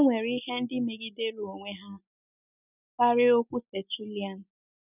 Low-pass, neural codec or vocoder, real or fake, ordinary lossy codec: 3.6 kHz; none; real; none